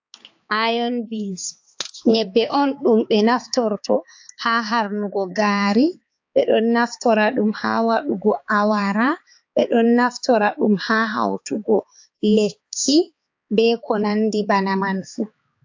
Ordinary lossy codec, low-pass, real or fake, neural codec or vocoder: AAC, 48 kbps; 7.2 kHz; fake; codec, 16 kHz, 4 kbps, X-Codec, HuBERT features, trained on balanced general audio